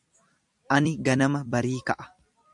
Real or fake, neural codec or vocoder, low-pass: fake; vocoder, 44.1 kHz, 128 mel bands every 256 samples, BigVGAN v2; 10.8 kHz